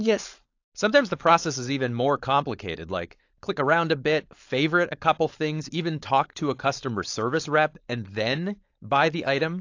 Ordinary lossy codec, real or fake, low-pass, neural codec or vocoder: AAC, 48 kbps; fake; 7.2 kHz; codec, 16 kHz, 4.8 kbps, FACodec